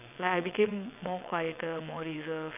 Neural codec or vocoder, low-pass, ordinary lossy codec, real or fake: vocoder, 22.05 kHz, 80 mel bands, WaveNeXt; 3.6 kHz; none; fake